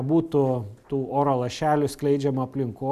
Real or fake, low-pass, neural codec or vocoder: real; 14.4 kHz; none